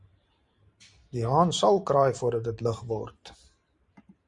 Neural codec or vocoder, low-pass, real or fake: vocoder, 44.1 kHz, 128 mel bands every 512 samples, BigVGAN v2; 10.8 kHz; fake